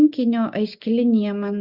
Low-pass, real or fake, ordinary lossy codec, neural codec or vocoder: 5.4 kHz; real; Opus, 64 kbps; none